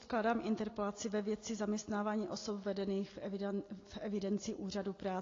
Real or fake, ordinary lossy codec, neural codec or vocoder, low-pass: real; AAC, 32 kbps; none; 7.2 kHz